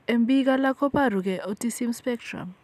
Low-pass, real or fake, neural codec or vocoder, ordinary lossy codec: 14.4 kHz; real; none; none